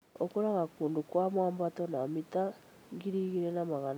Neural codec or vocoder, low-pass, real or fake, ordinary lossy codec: none; none; real; none